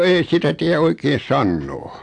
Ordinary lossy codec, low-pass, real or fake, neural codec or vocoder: AAC, 64 kbps; 9.9 kHz; real; none